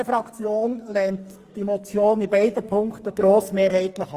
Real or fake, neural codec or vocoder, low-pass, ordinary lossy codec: fake; codec, 44.1 kHz, 2.6 kbps, SNAC; 14.4 kHz; Opus, 24 kbps